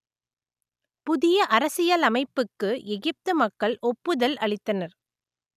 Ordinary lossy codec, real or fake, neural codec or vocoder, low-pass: none; real; none; 14.4 kHz